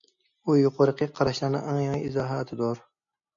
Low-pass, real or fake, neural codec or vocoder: 7.2 kHz; real; none